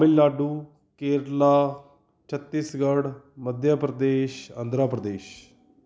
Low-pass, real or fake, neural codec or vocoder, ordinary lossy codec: none; real; none; none